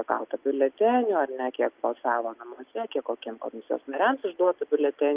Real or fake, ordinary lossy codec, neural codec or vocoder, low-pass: real; Opus, 24 kbps; none; 3.6 kHz